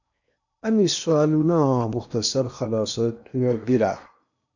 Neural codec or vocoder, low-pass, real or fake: codec, 16 kHz in and 24 kHz out, 0.8 kbps, FocalCodec, streaming, 65536 codes; 7.2 kHz; fake